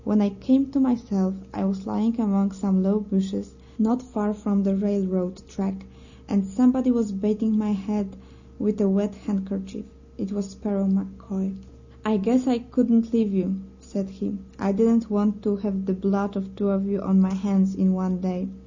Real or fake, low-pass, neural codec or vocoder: real; 7.2 kHz; none